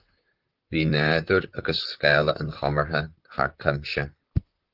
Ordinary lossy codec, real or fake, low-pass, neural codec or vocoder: Opus, 32 kbps; fake; 5.4 kHz; vocoder, 44.1 kHz, 128 mel bands, Pupu-Vocoder